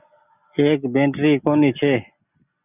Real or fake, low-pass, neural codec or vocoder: real; 3.6 kHz; none